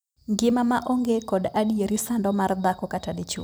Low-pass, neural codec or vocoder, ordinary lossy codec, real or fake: none; vocoder, 44.1 kHz, 128 mel bands every 256 samples, BigVGAN v2; none; fake